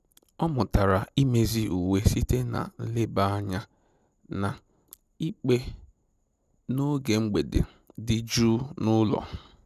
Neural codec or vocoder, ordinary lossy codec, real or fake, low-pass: none; none; real; 14.4 kHz